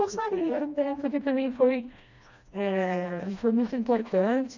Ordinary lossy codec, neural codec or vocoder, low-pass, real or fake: none; codec, 16 kHz, 1 kbps, FreqCodec, smaller model; 7.2 kHz; fake